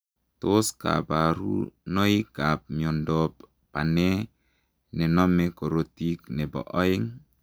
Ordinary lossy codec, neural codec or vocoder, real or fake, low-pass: none; none; real; none